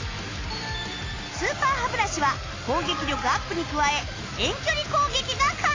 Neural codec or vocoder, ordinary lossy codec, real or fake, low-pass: none; AAC, 32 kbps; real; 7.2 kHz